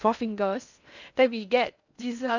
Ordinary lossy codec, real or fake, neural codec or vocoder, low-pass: none; fake; codec, 16 kHz in and 24 kHz out, 0.6 kbps, FocalCodec, streaming, 2048 codes; 7.2 kHz